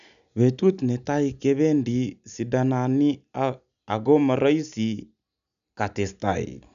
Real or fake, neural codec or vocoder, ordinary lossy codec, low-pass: real; none; none; 7.2 kHz